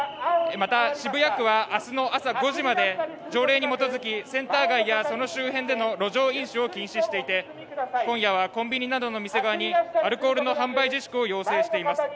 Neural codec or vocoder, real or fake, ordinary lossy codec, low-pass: none; real; none; none